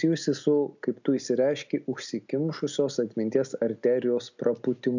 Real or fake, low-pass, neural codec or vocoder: real; 7.2 kHz; none